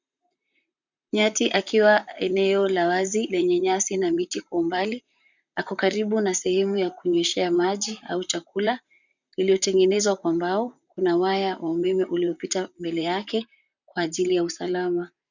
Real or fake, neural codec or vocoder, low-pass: fake; vocoder, 44.1 kHz, 128 mel bands, Pupu-Vocoder; 7.2 kHz